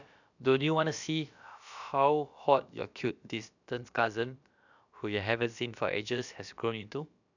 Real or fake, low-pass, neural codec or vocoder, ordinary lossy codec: fake; 7.2 kHz; codec, 16 kHz, about 1 kbps, DyCAST, with the encoder's durations; none